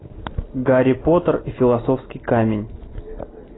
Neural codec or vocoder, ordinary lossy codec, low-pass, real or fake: none; AAC, 16 kbps; 7.2 kHz; real